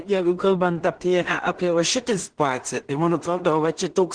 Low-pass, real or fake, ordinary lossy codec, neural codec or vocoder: 9.9 kHz; fake; Opus, 16 kbps; codec, 16 kHz in and 24 kHz out, 0.4 kbps, LongCat-Audio-Codec, two codebook decoder